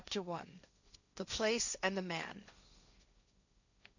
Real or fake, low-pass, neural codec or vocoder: fake; 7.2 kHz; codec, 16 kHz, 1.1 kbps, Voila-Tokenizer